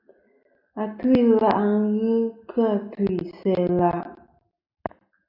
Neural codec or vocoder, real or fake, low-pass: none; real; 5.4 kHz